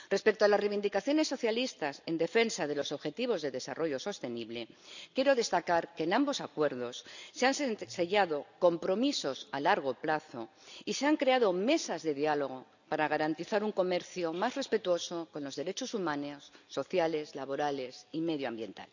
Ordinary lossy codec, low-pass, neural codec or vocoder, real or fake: none; 7.2 kHz; none; real